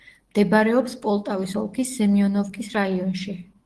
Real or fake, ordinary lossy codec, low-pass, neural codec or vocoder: fake; Opus, 16 kbps; 10.8 kHz; autoencoder, 48 kHz, 128 numbers a frame, DAC-VAE, trained on Japanese speech